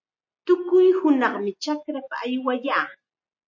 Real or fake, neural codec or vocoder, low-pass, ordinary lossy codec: real; none; 7.2 kHz; MP3, 32 kbps